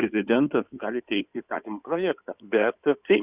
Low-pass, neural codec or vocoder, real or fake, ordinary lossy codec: 3.6 kHz; codec, 16 kHz in and 24 kHz out, 2.2 kbps, FireRedTTS-2 codec; fake; Opus, 64 kbps